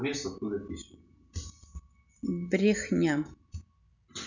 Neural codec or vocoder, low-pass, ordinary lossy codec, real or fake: none; 7.2 kHz; none; real